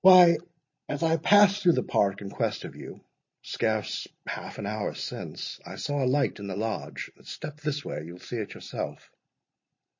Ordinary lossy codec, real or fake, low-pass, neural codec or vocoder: MP3, 32 kbps; real; 7.2 kHz; none